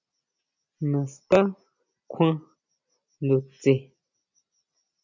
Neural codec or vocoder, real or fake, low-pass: none; real; 7.2 kHz